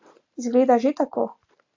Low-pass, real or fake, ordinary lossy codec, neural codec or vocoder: 7.2 kHz; real; AAC, 48 kbps; none